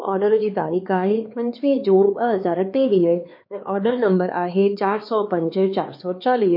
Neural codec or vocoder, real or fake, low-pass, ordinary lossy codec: codec, 16 kHz, 4 kbps, X-Codec, HuBERT features, trained on LibriSpeech; fake; 5.4 kHz; MP3, 32 kbps